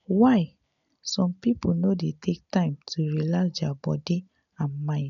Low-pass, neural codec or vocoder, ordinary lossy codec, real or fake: 7.2 kHz; none; none; real